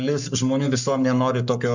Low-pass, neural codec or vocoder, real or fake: 7.2 kHz; codec, 44.1 kHz, 7.8 kbps, Pupu-Codec; fake